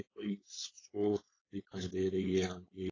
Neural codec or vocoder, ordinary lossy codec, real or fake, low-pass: codec, 16 kHz, 4.8 kbps, FACodec; AAC, 32 kbps; fake; 7.2 kHz